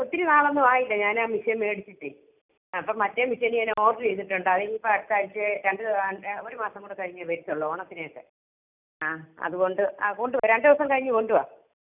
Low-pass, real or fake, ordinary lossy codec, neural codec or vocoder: 3.6 kHz; real; none; none